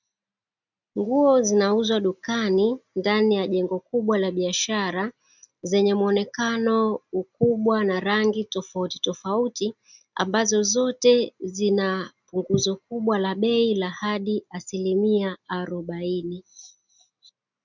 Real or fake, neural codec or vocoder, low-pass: real; none; 7.2 kHz